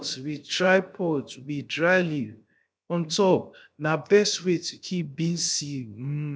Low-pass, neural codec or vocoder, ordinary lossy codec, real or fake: none; codec, 16 kHz, 0.7 kbps, FocalCodec; none; fake